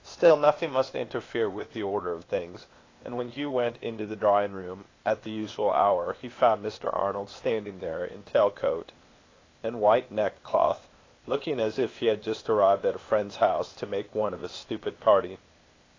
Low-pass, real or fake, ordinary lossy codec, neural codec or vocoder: 7.2 kHz; fake; AAC, 32 kbps; codec, 16 kHz, 0.8 kbps, ZipCodec